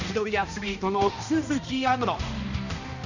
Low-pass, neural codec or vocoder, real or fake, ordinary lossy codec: 7.2 kHz; codec, 16 kHz, 1 kbps, X-Codec, HuBERT features, trained on balanced general audio; fake; none